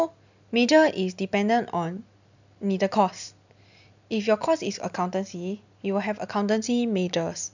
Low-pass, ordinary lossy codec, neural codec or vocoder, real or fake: 7.2 kHz; none; none; real